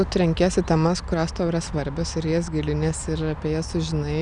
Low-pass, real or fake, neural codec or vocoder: 9.9 kHz; real; none